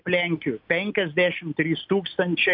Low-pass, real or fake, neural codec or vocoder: 5.4 kHz; real; none